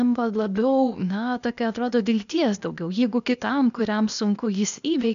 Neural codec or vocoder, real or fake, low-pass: codec, 16 kHz, 0.8 kbps, ZipCodec; fake; 7.2 kHz